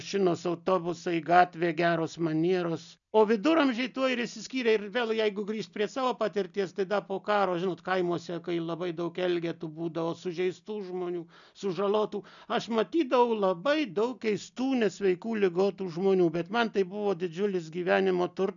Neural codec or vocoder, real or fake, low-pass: none; real; 7.2 kHz